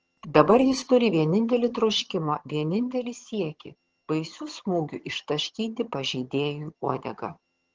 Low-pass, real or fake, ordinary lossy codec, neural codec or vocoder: 7.2 kHz; fake; Opus, 16 kbps; vocoder, 22.05 kHz, 80 mel bands, HiFi-GAN